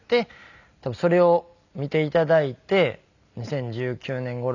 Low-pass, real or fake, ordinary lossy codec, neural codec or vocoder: 7.2 kHz; real; none; none